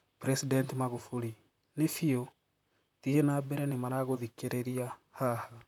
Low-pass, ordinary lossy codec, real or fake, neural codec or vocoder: 19.8 kHz; none; fake; vocoder, 44.1 kHz, 128 mel bands, Pupu-Vocoder